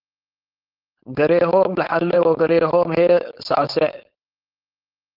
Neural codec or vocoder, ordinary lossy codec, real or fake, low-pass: codec, 16 kHz, 4.8 kbps, FACodec; Opus, 24 kbps; fake; 5.4 kHz